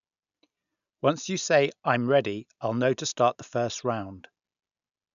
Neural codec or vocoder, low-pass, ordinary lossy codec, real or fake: none; 7.2 kHz; none; real